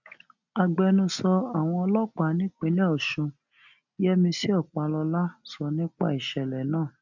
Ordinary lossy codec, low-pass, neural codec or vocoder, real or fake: none; 7.2 kHz; none; real